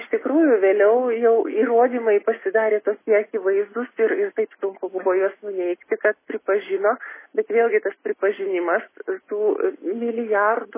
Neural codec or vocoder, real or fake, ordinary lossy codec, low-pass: none; real; MP3, 16 kbps; 3.6 kHz